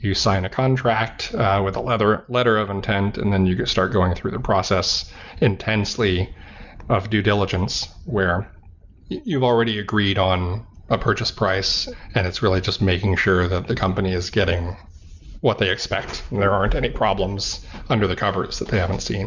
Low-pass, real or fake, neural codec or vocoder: 7.2 kHz; real; none